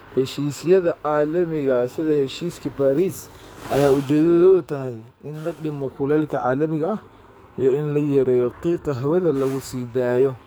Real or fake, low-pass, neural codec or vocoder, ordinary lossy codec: fake; none; codec, 44.1 kHz, 2.6 kbps, SNAC; none